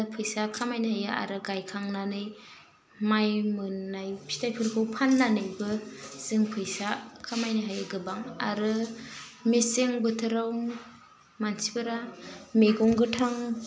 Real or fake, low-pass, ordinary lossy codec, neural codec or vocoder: real; none; none; none